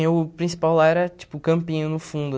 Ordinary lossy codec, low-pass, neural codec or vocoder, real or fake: none; none; none; real